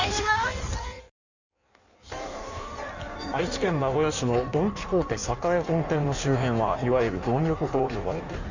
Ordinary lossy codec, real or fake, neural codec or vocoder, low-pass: none; fake; codec, 16 kHz in and 24 kHz out, 1.1 kbps, FireRedTTS-2 codec; 7.2 kHz